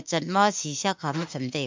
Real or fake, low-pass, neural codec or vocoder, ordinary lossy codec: fake; 7.2 kHz; autoencoder, 48 kHz, 32 numbers a frame, DAC-VAE, trained on Japanese speech; MP3, 64 kbps